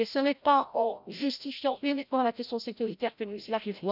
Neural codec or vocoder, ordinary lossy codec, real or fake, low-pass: codec, 16 kHz, 0.5 kbps, FreqCodec, larger model; none; fake; 5.4 kHz